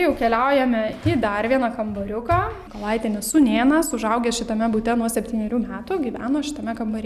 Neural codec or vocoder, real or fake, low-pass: none; real; 14.4 kHz